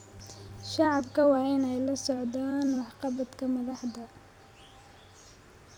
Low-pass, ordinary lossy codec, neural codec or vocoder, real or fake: 19.8 kHz; none; vocoder, 44.1 kHz, 128 mel bands every 256 samples, BigVGAN v2; fake